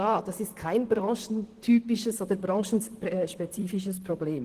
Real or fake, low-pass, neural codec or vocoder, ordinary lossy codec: fake; 14.4 kHz; vocoder, 44.1 kHz, 128 mel bands, Pupu-Vocoder; Opus, 16 kbps